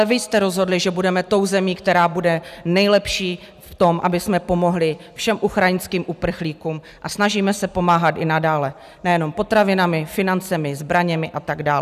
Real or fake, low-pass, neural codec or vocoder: real; 14.4 kHz; none